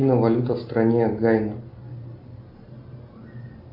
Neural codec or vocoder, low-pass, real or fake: none; 5.4 kHz; real